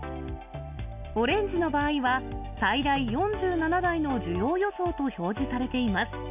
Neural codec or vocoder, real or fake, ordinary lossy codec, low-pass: none; real; none; 3.6 kHz